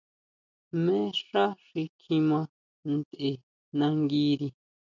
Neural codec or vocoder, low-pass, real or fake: none; 7.2 kHz; real